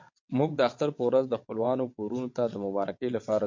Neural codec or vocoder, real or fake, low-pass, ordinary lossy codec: vocoder, 22.05 kHz, 80 mel bands, WaveNeXt; fake; 7.2 kHz; MP3, 48 kbps